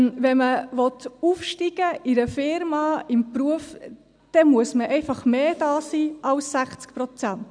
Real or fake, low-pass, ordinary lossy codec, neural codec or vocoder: real; 9.9 kHz; none; none